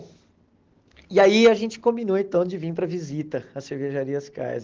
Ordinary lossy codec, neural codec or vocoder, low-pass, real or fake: Opus, 16 kbps; none; 7.2 kHz; real